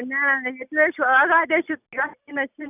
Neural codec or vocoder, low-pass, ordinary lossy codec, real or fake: none; 3.6 kHz; none; real